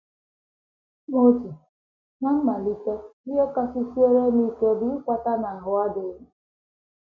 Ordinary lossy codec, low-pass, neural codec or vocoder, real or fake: none; 7.2 kHz; none; real